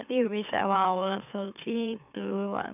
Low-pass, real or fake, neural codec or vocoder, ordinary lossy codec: 3.6 kHz; fake; autoencoder, 44.1 kHz, a latent of 192 numbers a frame, MeloTTS; none